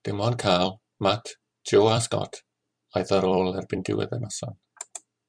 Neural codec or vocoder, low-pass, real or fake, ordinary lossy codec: vocoder, 44.1 kHz, 128 mel bands every 256 samples, BigVGAN v2; 9.9 kHz; fake; MP3, 96 kbps